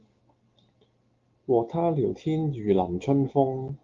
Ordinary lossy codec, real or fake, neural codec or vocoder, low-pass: Opus, 32 kbps; real; none; 7.2 kHz